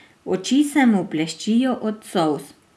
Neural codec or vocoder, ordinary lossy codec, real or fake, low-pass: none; none; real; none